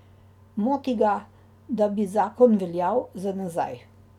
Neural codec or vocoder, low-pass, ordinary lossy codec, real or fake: none; 19.8 kHz; none; real